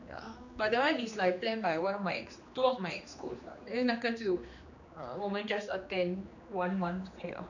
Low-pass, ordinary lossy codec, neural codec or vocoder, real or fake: 7.2 kHz; none; codec, 16 kHz, 2 kbps, X-Codec, HuBERT features, trained on general audio; fake